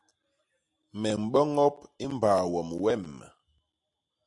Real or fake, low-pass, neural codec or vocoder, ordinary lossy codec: real; 10.8 kHz; none; AAC, 64 kbps